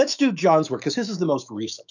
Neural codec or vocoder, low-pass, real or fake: autoencoder, 48 kHz, 128 numbers a frame, DAC-VAE, trained on Japanese speech; 7.2 kHz; fake